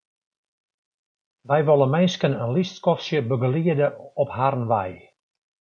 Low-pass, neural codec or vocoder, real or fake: 9.9 kHz; none; real